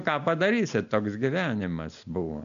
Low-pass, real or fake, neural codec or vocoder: 7.2 kHz; real; none